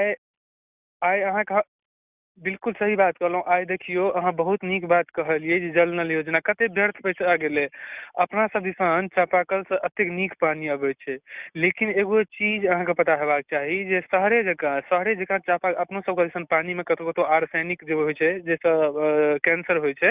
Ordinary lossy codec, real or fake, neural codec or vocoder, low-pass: Opus, 64 kbps; real; none; 3.6 kHz